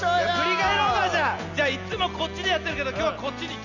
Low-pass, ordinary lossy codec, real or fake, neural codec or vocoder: 7.2 kHz; none; real; none